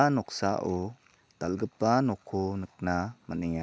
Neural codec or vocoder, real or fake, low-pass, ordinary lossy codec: none; real; none; none